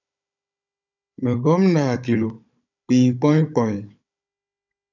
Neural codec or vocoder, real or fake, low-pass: codec, 16 kHz, 16 kbps, FunCodec, trained on Chinese and English, 50 frames a second; fake; 7.2 kHz